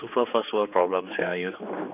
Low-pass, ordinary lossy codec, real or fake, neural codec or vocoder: 3.6 kHz; none; fake; codec, 16 kHz, 4 kbps, X-Codec, HuBERT features, trained on general audio